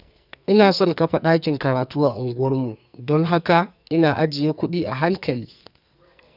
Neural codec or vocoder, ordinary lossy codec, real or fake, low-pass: codec, 44.1 kHz, 2.6 kbps, SNAC; none; fake; 5.4 kHz